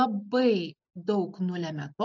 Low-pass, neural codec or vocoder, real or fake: 7.2 kHz; none; real